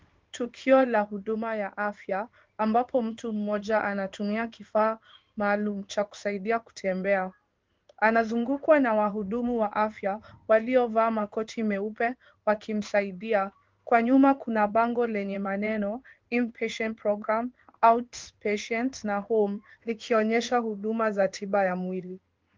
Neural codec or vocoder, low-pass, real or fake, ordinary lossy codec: codec, 16 kHz in and 24 kHz out, 1 kbps, XY-Tokenizer; 7.2 kHz; fake; Opus, 32 kbps